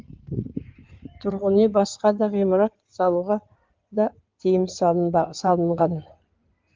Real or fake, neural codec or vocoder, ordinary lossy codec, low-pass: fake; codec, 16 kHz in and 24 kHz out, 2.2 kbps, FireRedTTS-2 codec; Opus, 32 kbps; 7.2 kHz